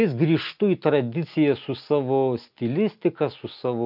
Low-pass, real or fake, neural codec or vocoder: 5.4 kHz; real; none